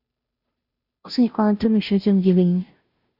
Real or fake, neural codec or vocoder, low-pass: fake; codec, 16 kHz, 0.5 kbps, FunCodec, trained on Chinese and English, 25 frames a second; 5.4 kHz